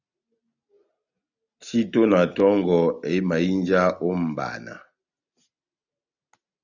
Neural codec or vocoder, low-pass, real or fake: none; 7.2 kHz; real